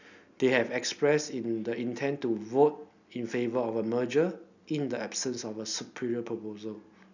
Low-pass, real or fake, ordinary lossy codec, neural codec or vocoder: 7.2 kHz; real; none; none